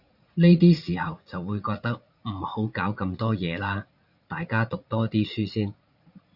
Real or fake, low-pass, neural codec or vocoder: fake; 5.4 kHz; vocoder, 44.1 kHz, 80 mel bands, Vocos